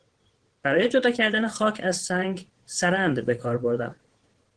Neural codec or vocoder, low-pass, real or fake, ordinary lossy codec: vocoder, 22.05 kHz, 80 mel bands, WaveNeXt; 9.9 kHz; fake; Opus, 16 kbps